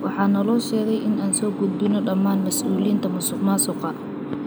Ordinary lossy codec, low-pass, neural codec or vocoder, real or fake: none; none; none; real